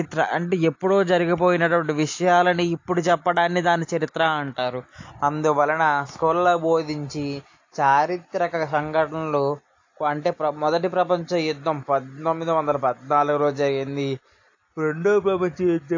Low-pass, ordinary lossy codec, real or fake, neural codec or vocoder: 7.2 kHz; AAC, 48 kbps; real; none